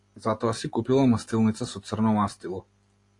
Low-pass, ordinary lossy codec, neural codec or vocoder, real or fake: 10.8 kHz; AAC, 48 kbps; none; real